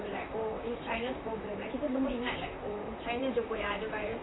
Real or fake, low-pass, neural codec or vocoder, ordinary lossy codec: fake; 7.2 kHz; vocoder, 44.1 kHz, 128 mel bands, Pupu-Vocoder; AAC, 16 kbps